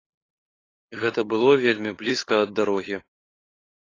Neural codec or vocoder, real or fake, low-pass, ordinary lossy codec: codec, 16 kHz, 8 kbps, FunCodec, trained on LibriTTS, 25 frames a second; fake; 7.2 kHz; AAC, 32 kbps